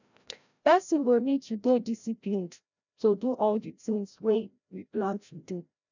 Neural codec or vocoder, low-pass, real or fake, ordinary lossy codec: codec, 16 kHz, 0.5 kbps, FreqCodec, larger model; 7.2 kHz; fake; none